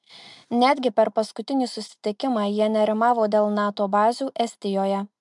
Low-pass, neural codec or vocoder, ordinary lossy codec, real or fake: 10.8 kHz; none; MP3, 96 kbps; real